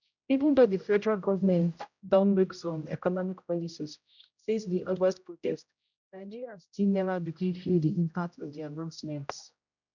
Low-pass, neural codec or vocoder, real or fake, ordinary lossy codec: 7.2 kHz; codec, 16 kHz, 0.5 kbps, X-Codec, HuBERT features, trained on general audio; fake; none